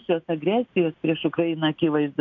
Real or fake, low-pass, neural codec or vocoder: real; 7.2 kHz; none